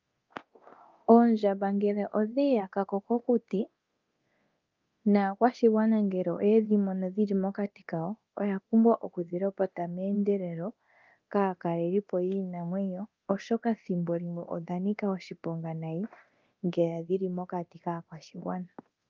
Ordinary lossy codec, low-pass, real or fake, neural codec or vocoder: Opus, 32 kbps; 7.2 kHz; fake; codec, 24 kHz, 0.9 kbps, DualCodec